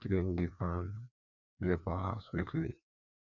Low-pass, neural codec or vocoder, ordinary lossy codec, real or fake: 7.2 kHz; codec, 16 kHz, 2 kbps, FreqCodec, larger model; none; fake